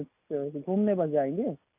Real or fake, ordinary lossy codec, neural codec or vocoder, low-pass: real; none; none; 3.6 kHz